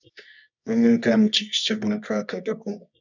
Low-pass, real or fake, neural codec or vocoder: 7.2 kHz; fake; codec, 24 kHz, 0.9 kbps, WavTokenizer, medium music audio release